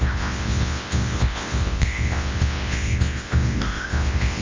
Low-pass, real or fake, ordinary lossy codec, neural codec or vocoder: 7.2 kHz; fake; Opus, 32 kbps; codec, 24 kHz, 0.9 kbps, WavTokenizer, large speech release